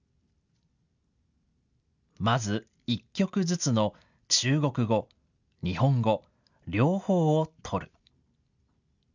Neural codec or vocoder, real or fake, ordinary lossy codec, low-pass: none; real; none; 7.2 kHz